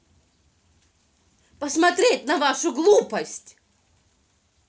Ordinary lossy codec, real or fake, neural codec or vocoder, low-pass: none; real; none; none